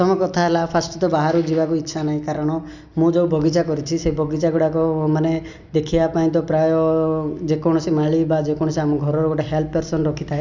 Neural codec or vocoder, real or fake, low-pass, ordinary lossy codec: none; real; 7.2 kHz; none